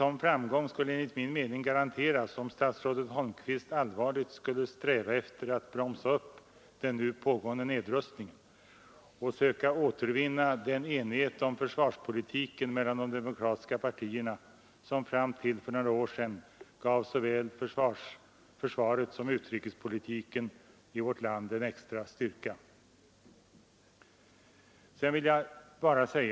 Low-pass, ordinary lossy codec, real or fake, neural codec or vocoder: none; none; real; none